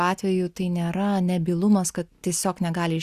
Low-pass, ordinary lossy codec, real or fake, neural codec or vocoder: 14.4 kHz; Opus, 64 kbps; real; none